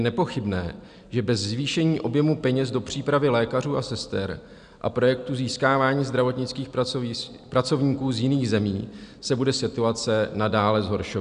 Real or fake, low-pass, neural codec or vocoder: real; 9.9 kHz; none